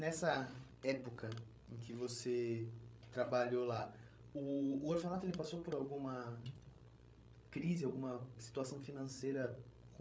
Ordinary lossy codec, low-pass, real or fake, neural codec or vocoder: none; none; fake; codec, 16 kHz, 16 kbps, FreqCodec, larger model